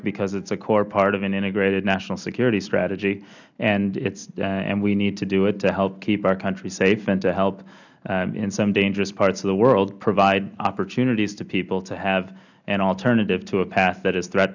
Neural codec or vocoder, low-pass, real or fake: none; 7.2 kHz; real